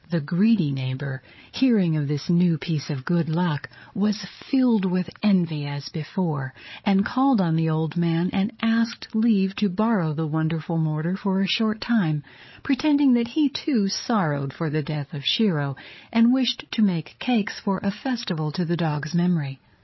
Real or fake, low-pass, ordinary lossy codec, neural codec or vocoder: fake; 7.2 kHz; MP3, 24 kbps; codec, 16 kHz, 8 kbps, FreqCodec, larger model